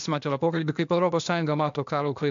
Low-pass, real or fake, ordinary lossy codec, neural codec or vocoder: 7.2 kHz; fake; MP3, 64 kbps; codec, 16 kHz, 0.8 kbps, ZipCodec